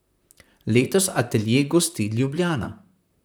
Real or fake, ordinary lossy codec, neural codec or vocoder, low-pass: fake; none; vocoder, 44.1 kHz, 128 mel bands, Pupu-Vocoder; none